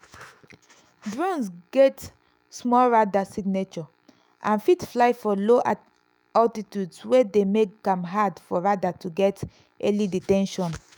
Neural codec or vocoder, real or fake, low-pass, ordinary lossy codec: autoencoder, 48 kHz, 128 numbers a frame, DAC-VAE, trained on Japanese speech; fake; none; none